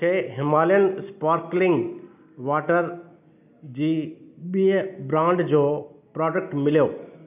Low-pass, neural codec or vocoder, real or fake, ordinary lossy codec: 3.6 kHz; none; real; none